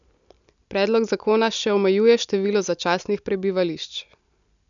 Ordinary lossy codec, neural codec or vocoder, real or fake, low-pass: none; none; real; 7.2 kHz